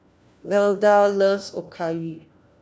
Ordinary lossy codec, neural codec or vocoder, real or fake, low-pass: none; codec, 16 kHz, 1 kbps, FunCodec, trained on LibriTTS, 50 frames a second; fake; none